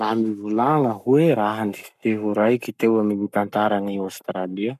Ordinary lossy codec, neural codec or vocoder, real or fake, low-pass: none; codec, 44.1 kHz, 7.8 kbps, Pupu-Codec; fake; 14.4 kHz